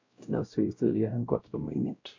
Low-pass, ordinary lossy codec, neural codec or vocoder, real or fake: 7.2 kHz; AAC, 48 kbps; codec, 16 kHz, 0.5 kbps, X-Codec, WavLM features, trained on Multilingual LibriSpeech; fake